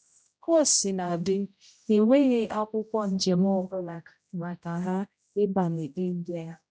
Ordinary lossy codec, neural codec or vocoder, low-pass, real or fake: none; codec, 16 kHz, 0.5 kbps, X-Codec, HuBERT features, trained on general audio; none; fake